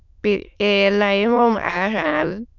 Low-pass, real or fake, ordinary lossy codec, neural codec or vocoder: 7.2 kHz; fake; none; autoencoder, 22.05 kHz, a latent of 192 numbers a frame, VITS, trained on many speakers